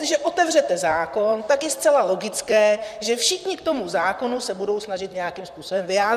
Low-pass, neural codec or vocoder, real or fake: 14.4 kHz; vocoder, 44.1 kHz, 128 mel bands, Pupu-Vocoder; fake